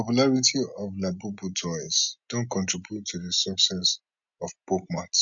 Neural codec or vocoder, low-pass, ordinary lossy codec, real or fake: none; 7.2 kHz; none; real